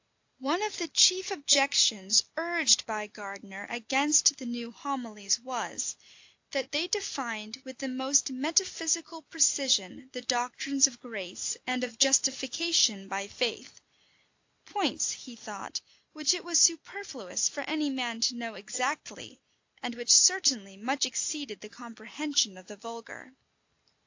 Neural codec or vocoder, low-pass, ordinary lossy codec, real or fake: none; 7.2 kHz; AAC, 48 kbps; real